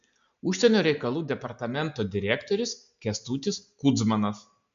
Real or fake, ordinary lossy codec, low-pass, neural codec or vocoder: real; MP3, 64 kbps; 7.2 kHz; none